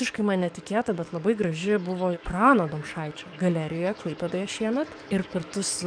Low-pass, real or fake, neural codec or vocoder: 9.9 kHz; fake; codec, 44.1 kHz, 7.8 kbps, DAC